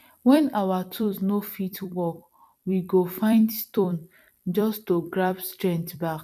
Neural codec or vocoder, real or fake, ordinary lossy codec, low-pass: vocoder, 44.1 kHz, 128 mel bands every 256 samples, BigVGAN v2; fake; none; 14.4 kHz